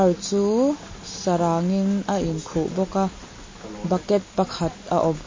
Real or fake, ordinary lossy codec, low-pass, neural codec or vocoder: real; MP3, 32 kbps; 7.2 kHz; none